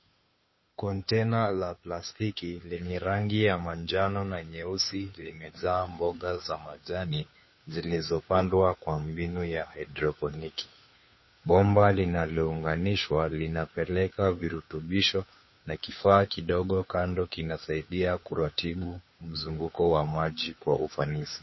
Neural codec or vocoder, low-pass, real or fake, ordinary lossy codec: codec, 16 kHz, 2 kbps, FunCodec, trained on Chinese and English, 25 frames a second; 7.2 kHz; fake; MP3, 24 kbps